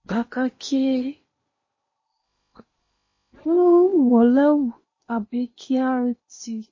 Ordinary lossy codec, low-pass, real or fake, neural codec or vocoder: MP3, 32 kbps; 7.2 kHz; fake; codec, 16 kHz in and 24 kHz out, 0.8 kbps, FocalCodec, streaming, 65536 codes